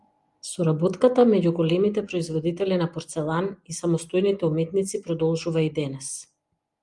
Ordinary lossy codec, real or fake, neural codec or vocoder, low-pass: Opus, 32 kbps; real; none; 10.8 kHz